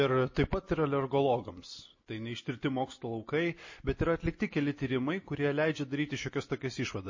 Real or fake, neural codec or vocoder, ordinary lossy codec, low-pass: real; none; MP3, 32 kbps; 7.2 kHz